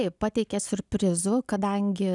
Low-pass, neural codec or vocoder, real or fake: 10.8 kHz; none; real